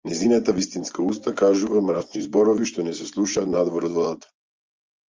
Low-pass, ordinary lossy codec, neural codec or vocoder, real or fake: 7.2 kHz; Opus, 32 kbps; vocoder, 24 kHz, 100 mel bands, Vocos; fake